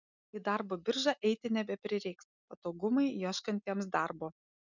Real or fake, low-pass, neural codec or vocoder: real; 7.2 kHz; none